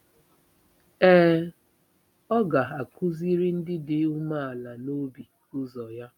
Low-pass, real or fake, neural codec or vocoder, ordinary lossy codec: 19.8 kHz; real; none; none